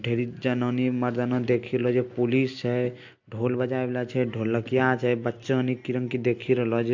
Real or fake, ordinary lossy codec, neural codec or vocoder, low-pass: real; AAC, 48 kbps; none; 7.2 kHz